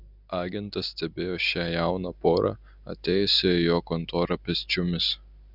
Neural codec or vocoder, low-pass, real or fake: none; 5.4 kHz; real